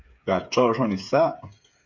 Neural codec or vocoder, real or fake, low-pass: codec, 16 kHz, 8 kbps, FreqCodec, smaller model; fake; 7.2 kHz